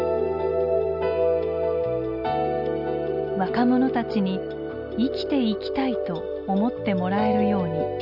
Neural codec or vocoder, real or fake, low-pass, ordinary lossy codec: none; real; 5.4 kHz; none